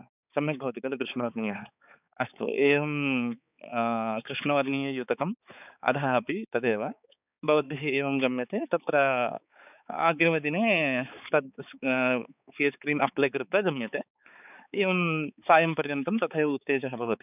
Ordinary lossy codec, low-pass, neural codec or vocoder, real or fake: none; 3.6 kHz; codec, 16 kHz, 4 kbps, X-Codec, HuBERT features, trained on balanced general audio; fake